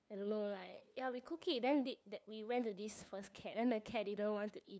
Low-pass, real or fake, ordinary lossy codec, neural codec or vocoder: none; fake; none; codec, 16 kHz, 2 kbps, FunCodec, trained on LibriTTS, 25 frames a second